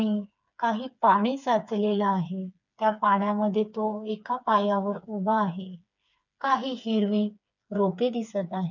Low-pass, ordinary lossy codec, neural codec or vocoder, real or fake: 7.2 kHz; none; codec, 16 kHz, 4 kbps, FreqCodec, smaller model; fake